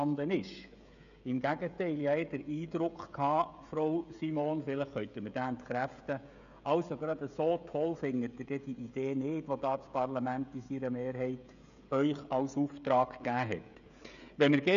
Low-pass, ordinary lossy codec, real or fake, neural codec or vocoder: 7.2 kHz; none; fake; codec, 16 kHz, 16 kbps, FreqCodec, smaller model